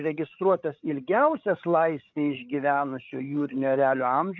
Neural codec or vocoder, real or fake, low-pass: codec, 16 kHz, 8 kbps, FreqCodec, larger model; fake; 7.2 kHz